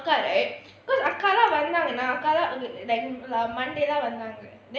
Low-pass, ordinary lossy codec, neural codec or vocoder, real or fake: none; none; none; real